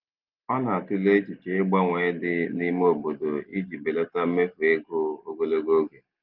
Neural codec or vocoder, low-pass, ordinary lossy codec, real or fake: none; 5.4 kHz; Opus, 24 kbps; real